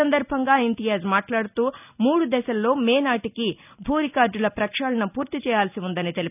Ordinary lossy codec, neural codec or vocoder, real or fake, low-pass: none; none; real; 3.6 kHz